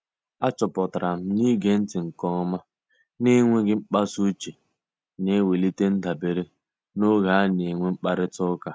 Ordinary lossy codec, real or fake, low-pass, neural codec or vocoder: none; real; none; none